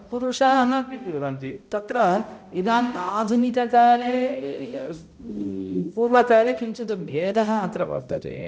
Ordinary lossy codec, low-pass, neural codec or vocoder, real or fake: none; none; codec, 16 kHz, 0.5 kbps, X-Codec, HuBERT features, trained on balanced general audio; fake